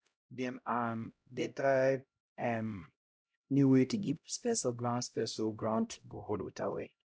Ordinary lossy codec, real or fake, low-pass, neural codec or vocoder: none; fake; none; codec, 16 kHz, 0.5 kbps, X-Codec, HuBERT features, trained on LibriSpeech